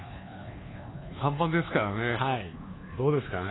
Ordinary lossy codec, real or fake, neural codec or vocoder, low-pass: AAC, 16 kbps; fake; codec, 24 kHz, 1.2 kbps, DualCodec; 7.2 kHz